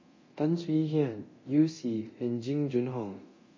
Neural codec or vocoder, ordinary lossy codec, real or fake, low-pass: codec, 24 kHz, 0.9 kbps, DualCodec; MP3, 32 kbps; fake; 7.2 kHz